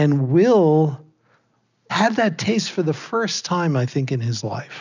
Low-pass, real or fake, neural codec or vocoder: 7.2 kHz; real; none